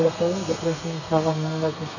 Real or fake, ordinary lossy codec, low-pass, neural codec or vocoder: fake; none; 7.2 kHz; codec, 32 kHz, 1.9 kbps, SNAC